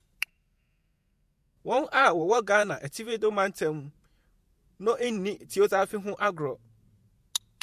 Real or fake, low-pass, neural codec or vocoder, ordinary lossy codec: fake; 14.4 kHz; vocoder, 48 kHz, 128 mel bands, Vocos; MP3, 64 kbps